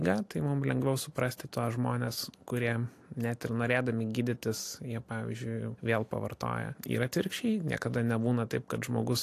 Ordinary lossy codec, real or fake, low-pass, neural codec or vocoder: AAC, 64 kbps; real; 14.4 kHz; none